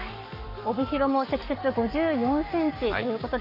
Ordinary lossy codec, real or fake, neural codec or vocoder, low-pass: none; fake; codec, 44.1 kHz, 7.8 kbps, Pupu-Codec; 5.4 kHz